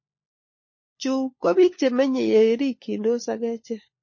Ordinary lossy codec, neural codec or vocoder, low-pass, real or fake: MP3, 32 kbps; codec, 16 kHz, 4 kbps, FunCodec, trained on LibriTTS, 50 frames a second; 7.2 kHz; fake